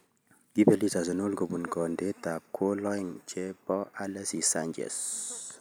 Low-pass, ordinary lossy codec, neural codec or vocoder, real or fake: none; none; none; real